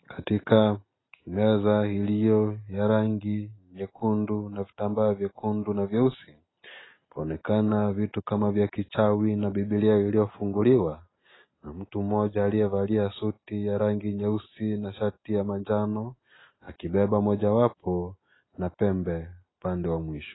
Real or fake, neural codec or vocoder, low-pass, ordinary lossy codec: real; none; 7.2 kHz; AAC, 16 kbps